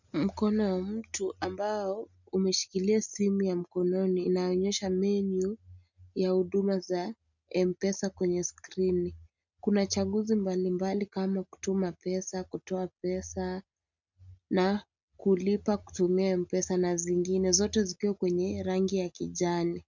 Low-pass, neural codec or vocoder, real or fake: 7.2 kHz; none; real